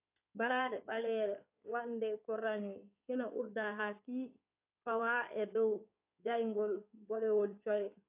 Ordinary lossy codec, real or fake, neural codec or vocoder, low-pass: AAC, 32 kbps; fake; codec, 16 kHz in and 24 kHz out, 2.2 kbps, FireRedTTS-2 codec; 3.6 kHz